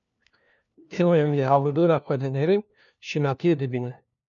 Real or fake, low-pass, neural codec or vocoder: fake; 7.2 kHz; codec, 16 kHz, 1 kbps, FunCodec, trained on LibriTTS, 50 frames a second